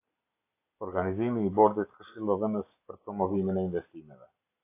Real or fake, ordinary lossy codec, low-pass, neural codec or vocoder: real; AAC, 24 kbps; 3.6 kHz; none